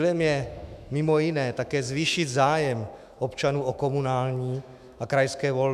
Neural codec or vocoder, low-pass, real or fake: autoencoder, 48 kHz, 128 numbers a frame, DAC-VAE, trained on Japanese speech; 14.4 kHz; fake